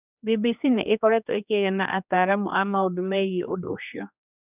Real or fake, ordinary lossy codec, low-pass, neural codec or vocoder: fake; none; 3.6 kHz; codec, 16 kHz, 2 kbps, X-Codec, HuBERT features, trained on general audio